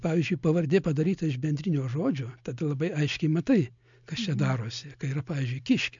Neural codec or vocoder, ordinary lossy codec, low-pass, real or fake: none; MP3, 64 kbps; 7.2 kHz; real